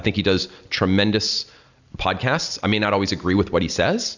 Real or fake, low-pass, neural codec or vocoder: real; 7.2 kHz; none